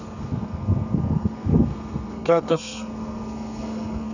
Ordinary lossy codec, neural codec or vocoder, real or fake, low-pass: none; codec, 32 kHz, 1.9 kbps, SNAC; fake; 7.2 kHz